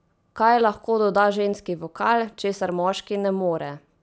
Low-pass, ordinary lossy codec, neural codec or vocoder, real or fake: none; none; none; real